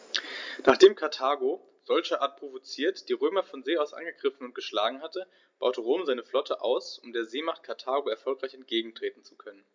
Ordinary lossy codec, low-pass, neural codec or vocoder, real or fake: MP3, 64 kbps; 7.2 kHz; none; real